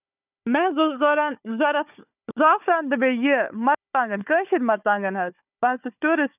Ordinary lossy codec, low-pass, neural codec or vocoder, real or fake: none; 3.6 kHz; codec, 16 kHz, 4 kbps, FunCodec, trained on Chinese and English, 50 frames a second; fake